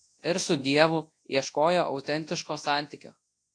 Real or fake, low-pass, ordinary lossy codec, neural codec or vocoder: fake; 9.9 kHz; AAC, 48 kbps; codec, 24 kHz, 0.9 kbps, WavTokenizer, large speech release